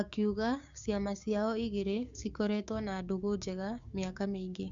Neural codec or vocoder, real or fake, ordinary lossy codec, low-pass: codec, 16 kHz, 6 kbps, DAC; fake; Opus, 64 kbps; 7.2 kHz